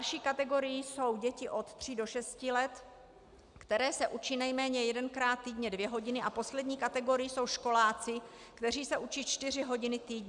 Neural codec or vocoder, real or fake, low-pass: none; real; 10.8 kHz